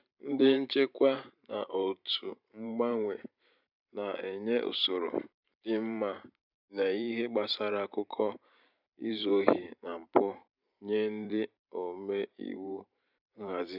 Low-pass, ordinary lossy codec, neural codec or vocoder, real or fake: 5.4 kHz; none; vocoder, 44.1 kHz, 128 mel bands every 512 samples, BigVGAN v2; fake